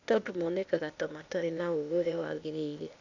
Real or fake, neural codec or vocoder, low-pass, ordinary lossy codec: fake; codec, 16 kHz, 0.8 kbps, ZipCodec; 7.2 kHz; none